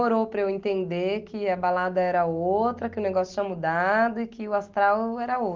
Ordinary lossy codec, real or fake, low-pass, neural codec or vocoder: Opus, 32 kbps; real; 7.2 kHz; none